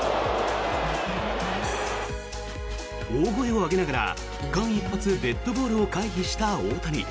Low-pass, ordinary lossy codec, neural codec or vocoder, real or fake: none; none; none; real